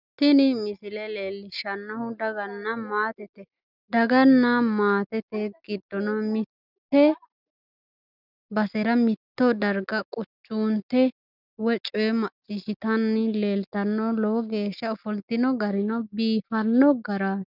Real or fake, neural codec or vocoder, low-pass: real; none; 5.4 kHz